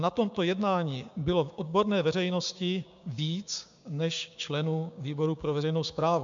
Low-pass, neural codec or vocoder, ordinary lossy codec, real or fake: 7.2 kHz; codec, 16 kHz, 6 kbps, DAC; MP3, 64 kbps; fake